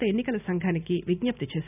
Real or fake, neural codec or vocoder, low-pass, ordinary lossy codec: real; none; 3.6 kHz; none